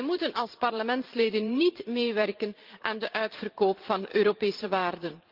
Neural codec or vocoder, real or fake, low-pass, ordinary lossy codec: vocoder, 44.1 kHz, 128 mel bands every 512 samples, BigVGAN v2; fake; 5.4 kHz; Opus, 32 kbps